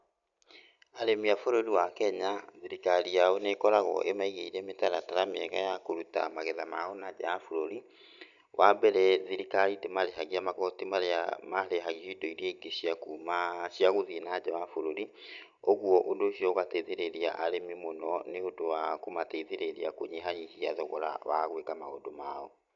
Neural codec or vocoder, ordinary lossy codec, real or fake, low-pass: none; none; real; 7.2 kHz